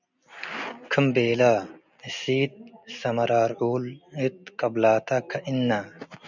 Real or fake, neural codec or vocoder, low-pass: real; none; 7.2 kHz